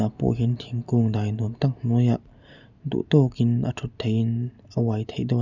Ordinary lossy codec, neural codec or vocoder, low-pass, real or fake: none; none; 7.2 kHz; real